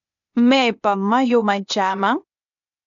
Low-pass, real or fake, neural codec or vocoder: 7.2 kHz; fake; codec, 16 kHz, 0.8 kbps, ZipCodec